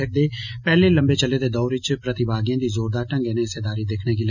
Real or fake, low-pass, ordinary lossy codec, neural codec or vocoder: real; 7.2 kHz; none; none